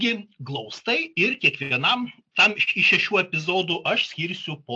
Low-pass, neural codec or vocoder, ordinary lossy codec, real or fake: 9.9 kHz; none; AAC, 64 kbps; real